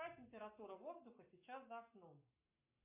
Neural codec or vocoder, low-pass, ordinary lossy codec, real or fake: codec, 44.1 kHz, 7.8 kbps, Pupu-Codec; 3.6 kHz; MP3, 32 kbps; fake